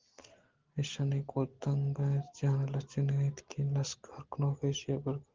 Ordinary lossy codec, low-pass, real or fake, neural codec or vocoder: Opus, 16 kbps; 7.2 kHz; real; none